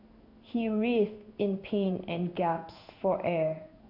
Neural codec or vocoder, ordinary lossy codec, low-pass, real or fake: codec, 16 kHz in and 24 kHz out, 1 kbps, XY-Tokenizer; MP3, 48 kbps; 5.4 kHz; fake